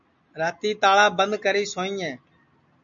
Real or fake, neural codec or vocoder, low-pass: real; none; 7.2 kHz